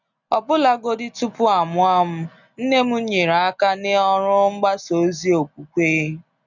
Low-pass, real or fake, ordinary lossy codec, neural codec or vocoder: 7.2 kHz; real; none; none